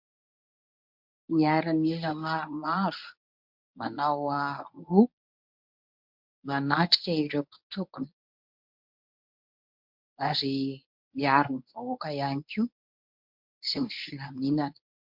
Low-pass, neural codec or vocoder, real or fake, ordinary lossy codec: 5.4 kHz; codec, 24 kHz, 0.9 kbps, WavTokenizer, medium speech release version 1; fake; MP3, 48 kbps